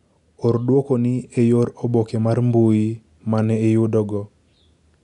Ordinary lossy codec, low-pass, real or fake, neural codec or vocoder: none; 10.8 kHz; real; none